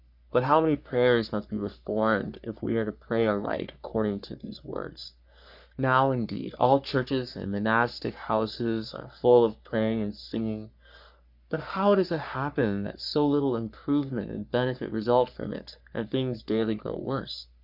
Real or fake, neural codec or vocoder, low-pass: fake; codec, 44.1 kHz, 3.4 kbps, Pupu-Codec; 5.4 kHz